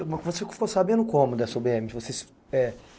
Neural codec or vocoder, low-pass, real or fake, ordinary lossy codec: none; none; real; none